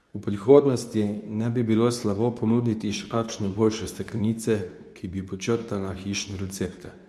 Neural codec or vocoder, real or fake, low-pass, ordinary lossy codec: codec, 24 kHz, 0.9 kbps, WavTokenizer, medium speech release version 2; fake; none; none